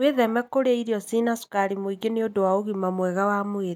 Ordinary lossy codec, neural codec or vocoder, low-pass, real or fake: none; none; 19.8 kHz; real